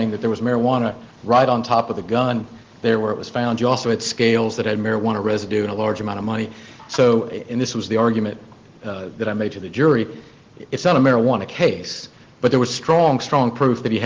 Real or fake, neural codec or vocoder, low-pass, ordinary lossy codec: real; none; 7.2 kHz; Opus, 16 kbps